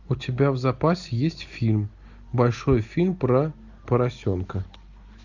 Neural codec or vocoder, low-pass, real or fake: none; 7.2 kHz; real